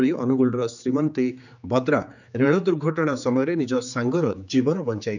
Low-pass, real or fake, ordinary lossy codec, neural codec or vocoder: 7.2 kHz; fake; none; codec, 16 kHz, 4 kbps, X-Codec, HuBERT features, trained on general audio